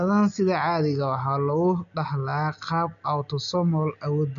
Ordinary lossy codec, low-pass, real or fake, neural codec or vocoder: none; 7.2 kHz; real; none